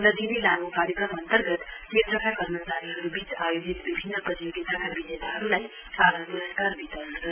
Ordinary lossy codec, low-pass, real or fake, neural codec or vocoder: none; 3.6 kHz; real; none